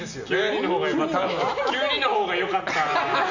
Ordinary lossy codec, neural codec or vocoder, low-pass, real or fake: none; none; 7.2 kHz; real